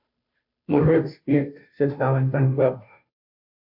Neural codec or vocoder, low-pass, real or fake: codec, 16 kHz, 0.5 kbps, FunCodec, trained on Chinese and English, 25 frames a second; 5.4 kHz; fake